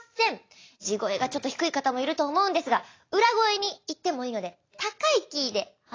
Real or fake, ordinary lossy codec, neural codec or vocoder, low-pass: real; AAC, 32 kbps; none; 7.2 kHz